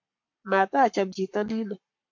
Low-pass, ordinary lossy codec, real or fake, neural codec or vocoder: 7.2 kHz; MP3, 48 kbps; fake; codec, 44.1 kHz, 7.8 kbps, Pupu-Codec